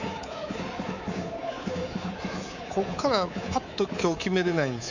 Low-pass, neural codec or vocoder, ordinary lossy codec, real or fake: 7.2 kHz; codec, 24 kHz, 3.1 kbps, DualCodec; none; fake